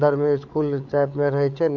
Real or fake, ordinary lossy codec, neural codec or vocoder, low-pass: fake; MP3, 64 kbps; codec, 16 kHz, 8 kbps, FreqCodec, larger model; 7.2 kHz